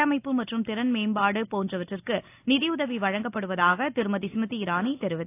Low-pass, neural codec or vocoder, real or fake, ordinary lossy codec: 3.6 kHz; none; real; AAC, 24 kbps